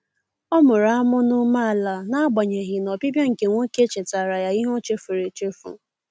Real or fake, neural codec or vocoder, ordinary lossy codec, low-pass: real; none; none; none